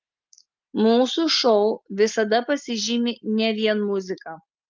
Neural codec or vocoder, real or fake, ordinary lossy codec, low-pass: none; real; Opus, 24 kbps; 7.2 kHz